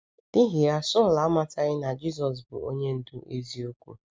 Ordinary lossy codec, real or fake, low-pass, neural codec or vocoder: none; real; none; none